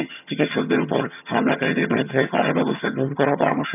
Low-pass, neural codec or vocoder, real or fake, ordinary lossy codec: 3.6 kHz; vocoder, 22.05 kHz, 80 mel bands, HiFi-GAN; fake; none